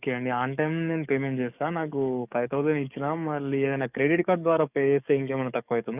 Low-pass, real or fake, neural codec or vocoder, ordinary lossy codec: 3.6 kHz; fake; codec, 44.1 kHz, 7.8 kbps, DAC; none